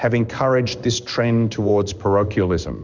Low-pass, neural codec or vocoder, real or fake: 7.2 kHz; codec, 16 kHz in and 24 kHz out, 1 kbps, XY-Tokenizer; fake